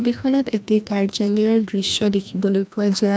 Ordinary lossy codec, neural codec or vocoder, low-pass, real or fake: none; codec, 16 kHz, 1 kbps, FreqCodec, larger model; none; fake